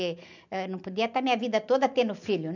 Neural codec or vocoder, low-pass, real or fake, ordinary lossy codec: none; 7.2 kHz; real; none